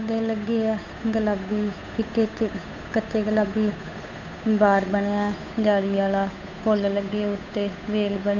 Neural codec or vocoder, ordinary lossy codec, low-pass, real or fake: codec, 16 kHz, 8 kbps, FunCodec, trained on Chinese and English, 25 frames a second; none; 7.2 kHz; fake